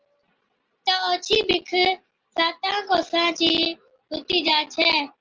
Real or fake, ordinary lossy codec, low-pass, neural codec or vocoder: real; Opus, 24 kbps; 7.2 kHz; none